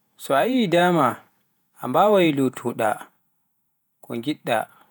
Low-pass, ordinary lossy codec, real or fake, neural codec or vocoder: none; none; fake; vocoder, 48 kHz, 128 mel bands, Vocos